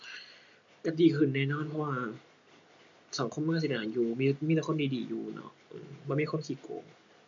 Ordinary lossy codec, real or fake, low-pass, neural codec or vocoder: MP3, 48 kbps; real; 7.2 kHz; none